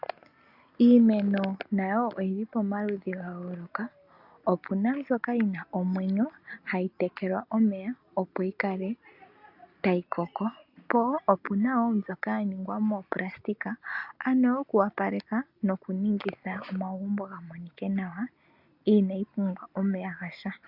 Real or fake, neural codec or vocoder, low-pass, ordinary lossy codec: real; none; 5.4 kHz; AAC, 48 kbps